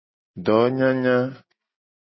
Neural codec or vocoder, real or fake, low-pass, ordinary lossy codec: none; real; 7.2 kHz; MP3, 24 kbps